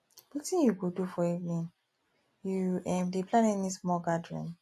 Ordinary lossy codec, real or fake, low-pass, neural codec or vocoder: AAC, 48 kbps; real; 14.4 kHz; none